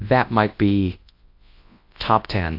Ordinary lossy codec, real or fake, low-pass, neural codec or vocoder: AAC, 32 kbps; fake; 5.4 kHz; codec, 24 kHz, 0.9 kbps, WavTokenizer, large speech release